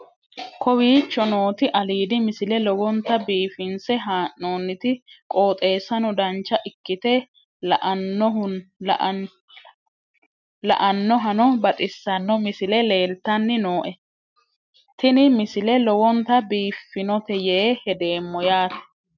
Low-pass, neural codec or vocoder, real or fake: 7.2 kHz; none; real